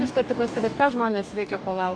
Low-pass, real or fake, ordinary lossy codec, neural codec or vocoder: 9.9 kHz; fake; MP3, 64 kbps; codec, 44.1 kHz, 2.6 kbps, DAC